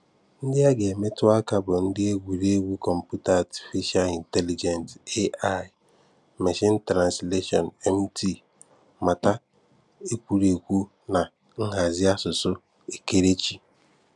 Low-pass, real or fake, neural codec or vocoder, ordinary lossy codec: 10.8 kHz; real; none; none